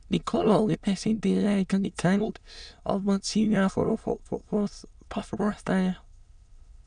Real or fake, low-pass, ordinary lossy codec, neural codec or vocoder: fake; 9.9 kHz; none; autoencoder, 22.05 kHz, a latent of 192 numbers a frame, VITS, trained on many speakers